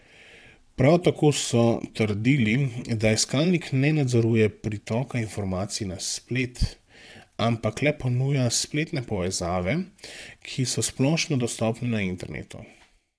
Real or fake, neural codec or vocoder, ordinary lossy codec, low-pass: fake; vocoder, 22.05 kHz, 80 mel bands, WaveNeXt; none; none